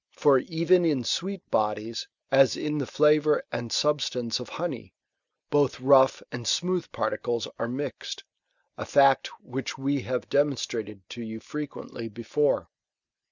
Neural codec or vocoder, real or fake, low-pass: none; real; 7.2 kHz